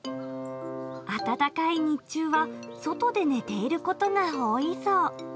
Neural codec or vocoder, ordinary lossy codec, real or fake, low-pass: none; none; real; none